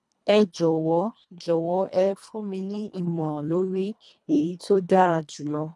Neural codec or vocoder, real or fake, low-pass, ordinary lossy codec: codec, 24 kHz, 1.5 kbps, HILCodec; fake; none; none